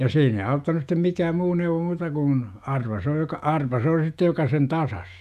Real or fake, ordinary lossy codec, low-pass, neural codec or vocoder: fake; none; 14.4 kHz; autoencoder, 48 kHz, 128 numbers a frame, DAC-VAE, trained on Japanese speech